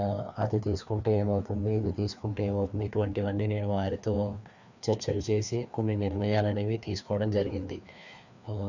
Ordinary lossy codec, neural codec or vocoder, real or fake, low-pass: none; codec, 16 kHz, 2 kbps, FreqCodec, larger model; fake; 7.2 kHz